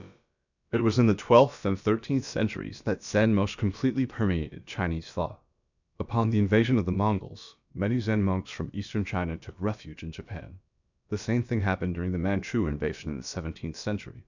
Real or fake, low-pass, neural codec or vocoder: fake; 7.2 kHz; codec, 16 kHz, about 1 kbps, DyCAST, with the encoder's durations